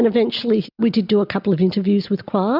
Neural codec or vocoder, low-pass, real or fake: none; 5.4 kHz; real